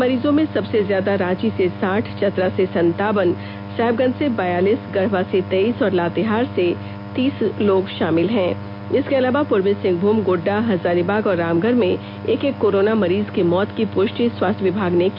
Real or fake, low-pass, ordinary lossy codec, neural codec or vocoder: real; 5.4 kHz; none; none